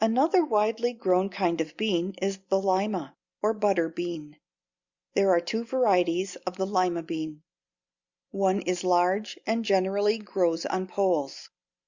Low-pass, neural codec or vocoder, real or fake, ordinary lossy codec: 7.2 kHz; none; real; Opus, 64 kbps